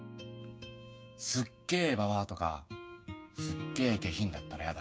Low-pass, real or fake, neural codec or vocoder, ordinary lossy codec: none; fake; codec, 16 kHz, 6 kbps, DAC; none